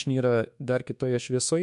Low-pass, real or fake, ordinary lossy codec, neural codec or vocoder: 10.8 kHz; fake; MP3, 64 kbps; codec, 24 kHz, 1.2 kbps, DualCodec